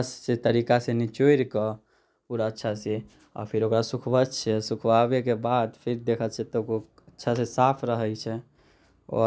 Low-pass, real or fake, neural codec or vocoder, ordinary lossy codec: none; real; none; none